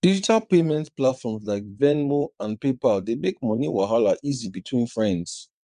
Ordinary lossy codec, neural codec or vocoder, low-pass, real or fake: MP3, 96 kbps; vocoder, 22.05 kHz, 80 mel bands, WaveNeXt; 9.9 kHz; fake